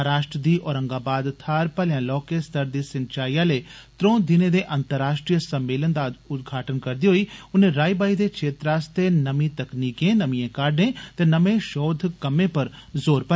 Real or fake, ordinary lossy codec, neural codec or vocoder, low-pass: real; none; none; none